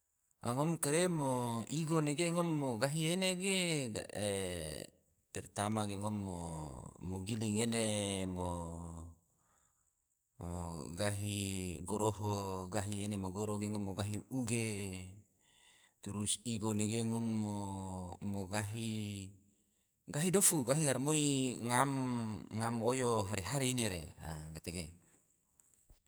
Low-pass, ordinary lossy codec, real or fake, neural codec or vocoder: none; none; fake; codec, 44.1 kHz, 2.6 kbps, SNAC